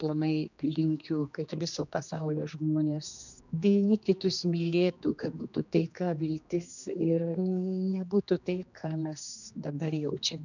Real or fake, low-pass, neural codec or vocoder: fake; 7.2 kHz; codec, 16 kHz, 2 kbps, X-Codec, HuBERT features, trained on general audio